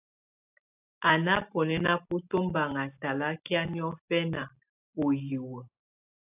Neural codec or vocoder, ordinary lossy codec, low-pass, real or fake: none; AAC, 32 kbps; 3.6 kHz; real